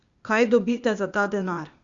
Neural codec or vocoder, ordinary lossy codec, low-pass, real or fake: codec, 16 kHz, 0.8 kbps, ZipCodec; Opus, 64 kbps; 7.2 kHz; fake